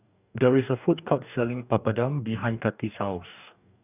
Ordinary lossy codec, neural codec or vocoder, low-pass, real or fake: none; codec, 44.1 kHz, 2.6 kbps, DAC; 3.6 kHz; fake